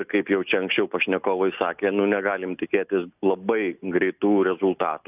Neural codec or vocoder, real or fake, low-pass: none; real; 3.6 kHz